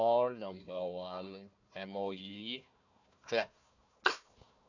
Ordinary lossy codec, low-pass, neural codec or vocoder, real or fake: none; 7.2 kHz; codec, 16 kHz, 1 kbps, FunCodec, trained on Chinese and English, 50 frames a second; fake